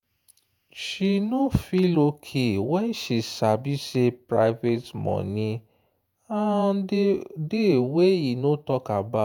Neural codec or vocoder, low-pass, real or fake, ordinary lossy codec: vocoder, 48 kHz, 128 mel bands, Vocos; none; fake; none